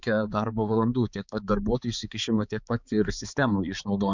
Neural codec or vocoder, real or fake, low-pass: codec, 16 kHz, 4 kbps, X-Codec, HuBERT features, trained on balanced general audio; fake; 7.2 kHz